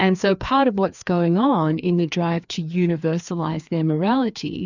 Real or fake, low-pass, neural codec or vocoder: fake; 7.2 kHz; codec, 16 kHz, 2 kbps, FreqCodec, larger model